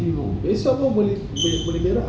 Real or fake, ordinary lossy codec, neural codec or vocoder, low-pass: real; none; none; none